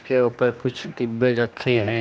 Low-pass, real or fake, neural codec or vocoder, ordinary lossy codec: none; fake; codec, 16 kHz, 1 kbps, X-Codec, HuBERT features, trained on general audio; none